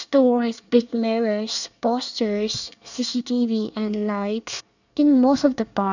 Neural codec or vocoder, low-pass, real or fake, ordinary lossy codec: codec, 24 kHz, 1 kbps, SNAC; 7.2 kHz; fake; none